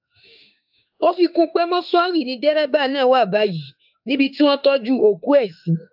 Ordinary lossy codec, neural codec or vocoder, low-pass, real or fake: AAC, 48 kbps; autoencoder, 48 kHz, 32 numbers a frame, DAC-VAE, trained on Japanese speech; 5.4 kHz; fake